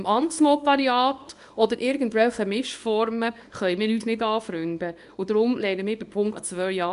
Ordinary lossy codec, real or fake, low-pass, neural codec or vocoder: none; fake; 10.8 kHz; codec, 24 kHz, 0.9 kbps, WavTokenizer, small release